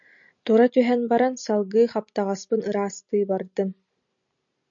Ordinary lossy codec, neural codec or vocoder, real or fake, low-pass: MP3, 64 kbps; none; real; 7.2 kHz